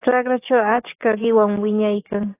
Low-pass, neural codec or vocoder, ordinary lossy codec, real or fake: 3.6 kHz; codec, 44.1 kHz, 7.8 kbps, DAC; AAC, 16 kbps; fake